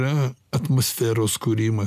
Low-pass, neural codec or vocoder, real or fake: 14.4 kHz; none; real